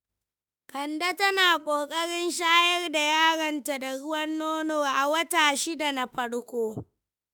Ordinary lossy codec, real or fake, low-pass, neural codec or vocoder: none; fake; none; autoencoder, 48 kHz, 32 numbers a frame, DAC-VAE, trained on Japanese speech